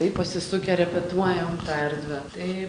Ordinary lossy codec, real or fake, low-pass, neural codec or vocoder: Opus, 64 kbps; real; 9.9 kHz; none